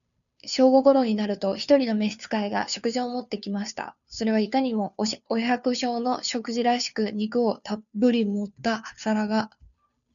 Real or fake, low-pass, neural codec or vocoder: fake; 7.2 kHz; codec, 16 kHz, 2 kbps, FunCodec, trained on Chinese and English, 25 frames a second